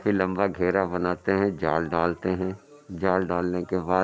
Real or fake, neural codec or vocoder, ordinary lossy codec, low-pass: real; none; none; none